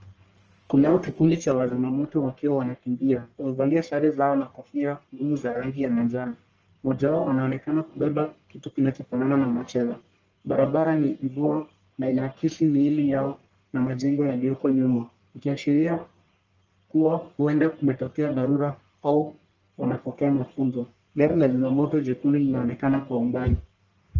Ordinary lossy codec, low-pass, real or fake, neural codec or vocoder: Opus, 24 kbps; 7.2 kHz; fake; codec, 44.1 kHz, 1.7 kbps, Pupu-Codec